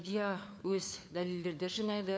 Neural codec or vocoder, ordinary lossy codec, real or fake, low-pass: codec, 16 kHz, 4 kbps, FunCodec, trained on LibriTTS, 50 frames a second; none; fake; none